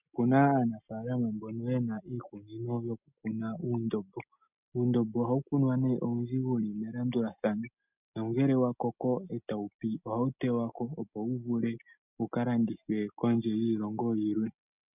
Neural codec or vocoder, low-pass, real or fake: none; 3.6 kHz; real